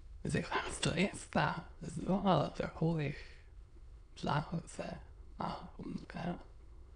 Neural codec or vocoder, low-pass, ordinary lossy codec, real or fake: autoencoder, 22.05 kHz, a latent of 192 numbers a frame, VITS, trained on many speakers; 9.9 kHz; none; fake